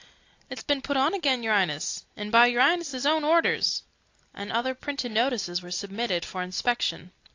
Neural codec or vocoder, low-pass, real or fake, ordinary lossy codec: none; 7.2 kHz; real; AAC, 48 kbps